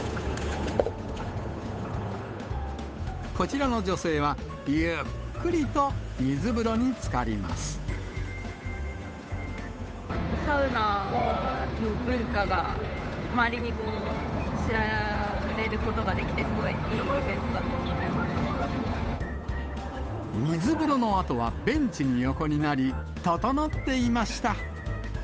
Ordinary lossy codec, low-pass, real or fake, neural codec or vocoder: none; none; fake; codec, 16 kHz, 8 kbps, FunCodec, trained on Chinese and English, 25 frames a second